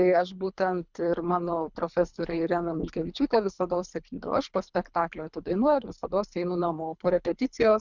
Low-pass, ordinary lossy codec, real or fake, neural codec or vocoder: 7.2 kHz; Opus, 64 kbps; fake; codec, 24 kHz, 3 kbps, HILCodec